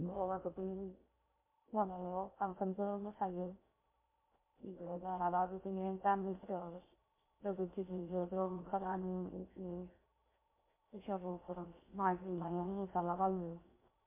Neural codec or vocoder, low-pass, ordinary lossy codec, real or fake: codec, 16 kHz in and 24 kHz out, 0.6 kbps, FocalCodec, streaming, 2048 codes; 3.6 kHz; MP3, 24 kbps; fake